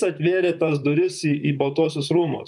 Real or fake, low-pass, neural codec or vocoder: fake; 10.8 kHz; vocoder, 44.1 kHz, 128 mel bands, Pupu-Vocoder